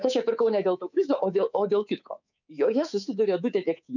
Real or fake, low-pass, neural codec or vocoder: fake; 7.2 kHz; codec, 24 kHz, 3.1 kbps, DualCodec